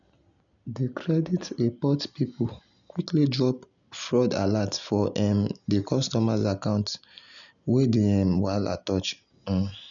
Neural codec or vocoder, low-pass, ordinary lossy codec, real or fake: none; 7.2 kHz; none; real